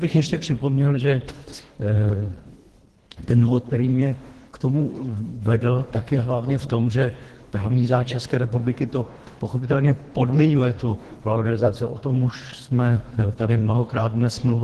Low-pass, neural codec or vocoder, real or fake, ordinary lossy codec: 10.8 kHz; codec, 24 kHz, 1.5 kbps, HILCodec; fake; Opus, 16 kbps